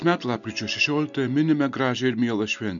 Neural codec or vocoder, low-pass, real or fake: none; 7.2 kHz; real